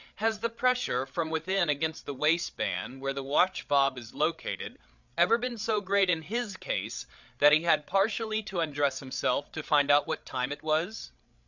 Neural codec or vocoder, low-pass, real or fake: codec, 16 kHz, 8 kbps, FreqCodec, larger model; 7.2 kHz; fake